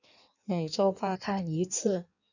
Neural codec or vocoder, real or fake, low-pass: codec, 16 kHz in and 24 kHz out, 1.1 kbps, FireRedTTS-2 codec; fake; 7.2 kHz